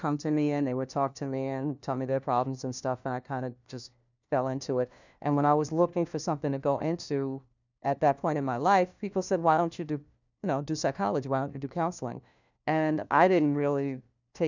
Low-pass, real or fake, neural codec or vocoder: 7.2 kHz; fake; codec, 16 kHz, 1 kbps, FunCodec, trained on LibriTTS, 50 frames a second